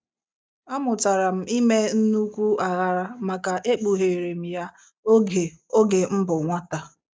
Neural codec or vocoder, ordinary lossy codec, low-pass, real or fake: none; none; none; real